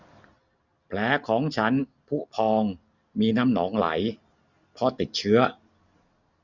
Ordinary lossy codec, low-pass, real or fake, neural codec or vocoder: none; 7.2 kHz; real; none